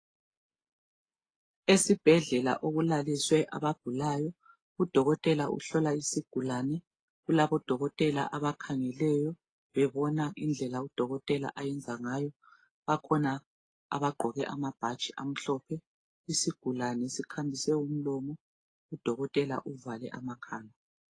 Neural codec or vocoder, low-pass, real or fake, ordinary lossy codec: none; 9.9 kHz; real; AAC, 32 kbps